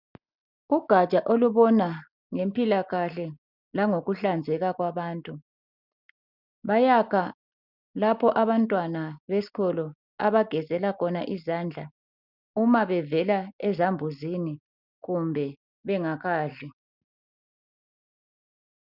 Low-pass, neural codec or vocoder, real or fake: 5.4 kHz; none; real